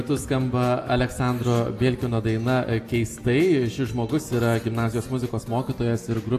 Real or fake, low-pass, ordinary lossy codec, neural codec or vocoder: real; 14.4 kHz; AAC, 64 kbps; none